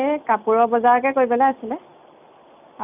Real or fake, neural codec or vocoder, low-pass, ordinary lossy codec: real; none; 3.6 kHz; none